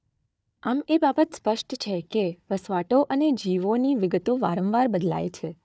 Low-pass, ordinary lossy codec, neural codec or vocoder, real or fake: none; none; codec, 16 kHz, 4 kbps, FunCodec, trained on Chinese and English, 50 frames a second; fake